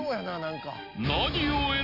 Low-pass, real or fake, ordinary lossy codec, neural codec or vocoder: 5.4 kHz; real; Opus, 64 kbps; none